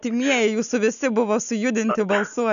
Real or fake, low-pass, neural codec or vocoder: real; 7.2 kHz; none